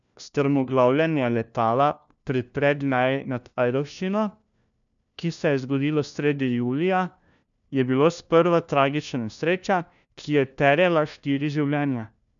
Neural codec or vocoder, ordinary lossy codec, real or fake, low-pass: codec, 16 kHz, 1 kbps, FunCodec, trained on LibriTTS, 50 frames a second; none; fake; 7.2 kHz